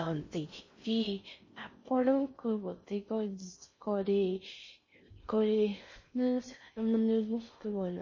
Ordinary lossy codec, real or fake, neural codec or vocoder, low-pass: MP3, 32 kbps; fake; codec, 16 kHz in and 24 kHz out, 0.6 kbps, FocalCodec, streaming, 4096 codes; 7.2 kHz